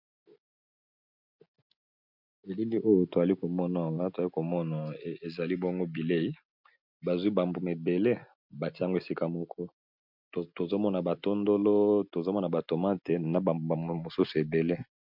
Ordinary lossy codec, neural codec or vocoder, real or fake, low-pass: MP3, 48 kbps; none; real; 5.4 kHz